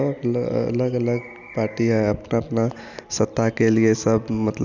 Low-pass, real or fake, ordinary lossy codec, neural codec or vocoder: 7.2 kHz; real; none; none